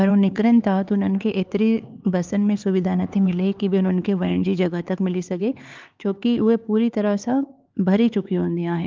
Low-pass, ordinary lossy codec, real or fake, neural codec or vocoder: 7.2 kHz; Opus, 24 kbps; fake; codec, 16 kHz, 4 kbps, X-Codec, HuBERT features, trained on LibriSpeech